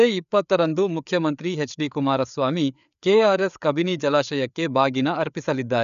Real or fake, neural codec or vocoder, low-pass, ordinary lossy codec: fake; codec, 16 kHz, 4 kbps, FreqCodec, larger model; 7.2 kHz; none